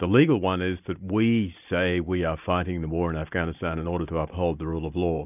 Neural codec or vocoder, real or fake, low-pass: none; real; 3.6 kHz